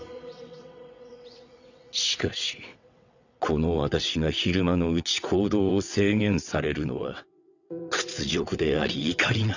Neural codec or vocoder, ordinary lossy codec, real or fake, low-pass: vocoder, 22.05 kHz, 80 mel bands, WaveNeXt; none; fake; 7.2 kHz